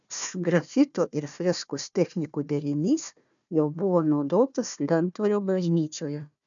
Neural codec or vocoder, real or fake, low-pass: codec, 16 kHz, 1 kbps, FunCodec, trained on Chinese and English, 50 frames a second; fake; 7.2 kHz